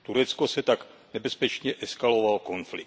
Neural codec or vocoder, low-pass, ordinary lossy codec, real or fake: none; none; none; real